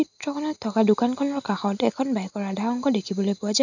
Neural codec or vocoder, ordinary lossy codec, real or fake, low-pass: none; none; real; 7.2 kHz